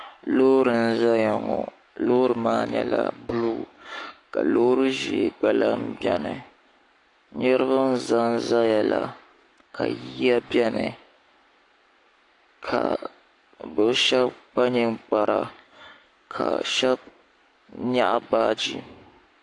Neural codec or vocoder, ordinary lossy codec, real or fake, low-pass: codec, 44.1 kHz, 7.8 kbps, Pupu-Codec; AAC, 48 kbps; fake; 10.8 kHz